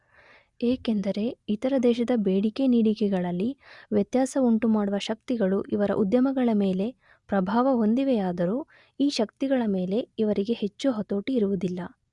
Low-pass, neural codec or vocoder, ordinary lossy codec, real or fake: 10.8 kHz; none; Opus, 64 kbps; real